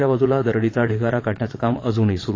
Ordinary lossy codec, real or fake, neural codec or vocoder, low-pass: AAC, 32 kbps; fake; vocoder, 22.05 kHz, 80 mel bands, Vocos; 7.2 kHz